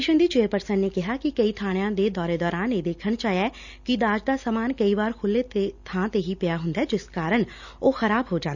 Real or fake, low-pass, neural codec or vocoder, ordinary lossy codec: real; 7.2 kHz; none; none